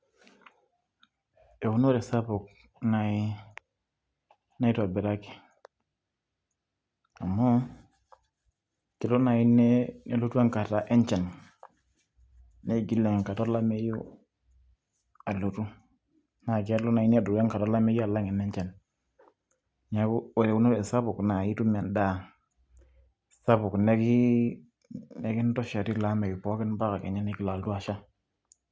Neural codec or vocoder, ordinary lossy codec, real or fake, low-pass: none; none; real; none